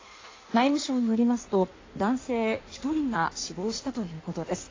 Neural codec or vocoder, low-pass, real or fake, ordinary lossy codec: codec, 16 kHz in and 24 kHz out, 1.1 kbps, FireRedTTS-2 codec; 7.2 kHz; fake; AAC, 32 kbps